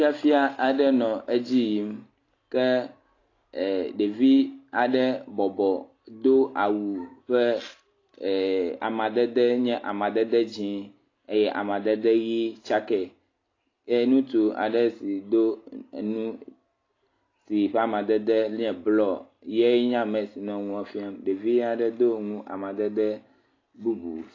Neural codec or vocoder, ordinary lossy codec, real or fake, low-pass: none; AAC, 32 kbps; real; 7.2 kHz